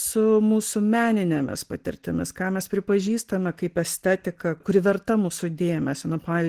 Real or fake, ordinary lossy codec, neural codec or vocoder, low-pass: real; Opus, 16 kbps; none; 14.4 kHz